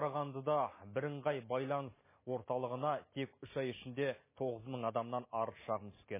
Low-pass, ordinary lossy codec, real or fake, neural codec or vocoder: 3.6 kHz; MP3, 16 kbps; real; none